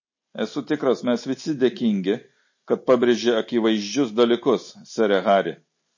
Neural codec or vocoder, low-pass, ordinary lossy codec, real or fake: none; 7.2 kHz; MP3, 32 kbps; real